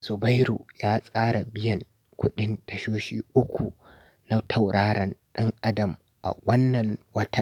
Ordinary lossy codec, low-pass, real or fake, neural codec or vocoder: none; 19.8 kHz; fake; codec, 44.1 kHz, 7.8 kbps, DAC